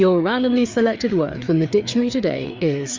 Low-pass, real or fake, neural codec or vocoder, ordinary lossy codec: 7.2 kHz; fake; codec, 16 kHz, 4 kbps, FreqCodec, larger model; MP3, 64 kbps